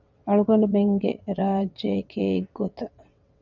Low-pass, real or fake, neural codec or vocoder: 7.2 kHz; fake; vocoder, 22.05 kHz, 80 mel bands, WaveNeXt